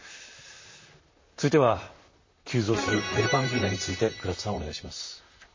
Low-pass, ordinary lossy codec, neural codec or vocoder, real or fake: 7.2 kHz; MP3, 32 kbps; vocoder, 44.1 kHz, 128 mel bands, Pupu-Vocoder; fake